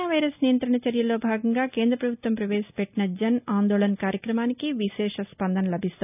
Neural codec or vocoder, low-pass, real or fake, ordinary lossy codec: none; 3.6 kHz; real; none